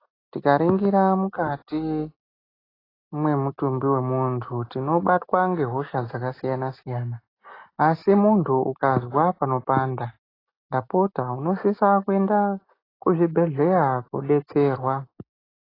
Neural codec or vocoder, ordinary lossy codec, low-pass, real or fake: none; AAC, 24 kbps; 5.4 kHz; real